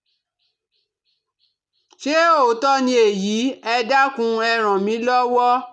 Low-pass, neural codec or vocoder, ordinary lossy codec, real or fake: none; none; none; real